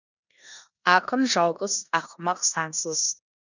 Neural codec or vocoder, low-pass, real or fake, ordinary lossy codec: codec, 16 kHz in and 24 kHz out, 0.9 kbps, LongCat-Audio-Codec, four codebook decoder; 7.2 kHz; fake; none